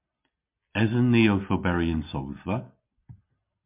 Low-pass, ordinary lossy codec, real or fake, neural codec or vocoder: 3.6 kHz; MP3, 32 kbps; real; none